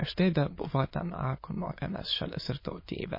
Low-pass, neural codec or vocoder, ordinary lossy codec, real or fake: 5.4 kHz; autoencoder, 22.05 kHz, a latent of 192 numbers a frame, VITS, trained on many speakers; MP3, 24 kbps; fake